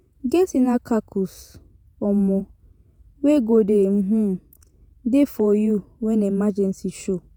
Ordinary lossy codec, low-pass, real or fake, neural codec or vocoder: none; none; fake; vocoder, 48 kHz, 128 mel bands, Vocos